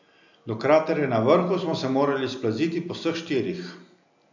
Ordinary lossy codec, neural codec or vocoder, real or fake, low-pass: none; none; real; 7.2 kHz